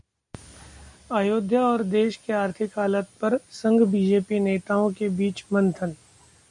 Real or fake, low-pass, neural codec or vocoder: real; 10.8 kHz; none